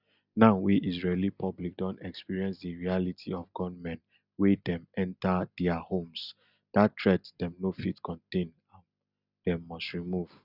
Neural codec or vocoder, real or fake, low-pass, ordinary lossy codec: none; real; 5.4 kHz; none